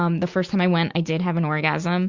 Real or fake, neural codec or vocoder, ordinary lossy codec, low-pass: real; none; Opus, 64 kbps; 7.2 kHz